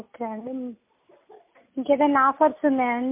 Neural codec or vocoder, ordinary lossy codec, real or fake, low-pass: none; MP3, 24 kbps; real; 3.6 kHz